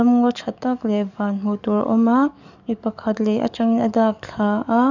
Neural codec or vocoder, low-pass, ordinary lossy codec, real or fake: codec, 44.1 kHz, 7.8 kbps, DAC; 7.2 kHz; none; fake